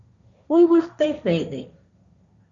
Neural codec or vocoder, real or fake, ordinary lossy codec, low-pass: codec, 16 kHz, 1.1 kbps, Voila-Tokenizer; fake; Opus, 64 kbps; 7.2 kHz